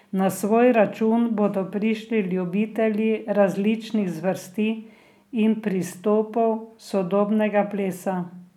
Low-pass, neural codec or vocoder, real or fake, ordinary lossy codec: 19.8 kHz; none; real; none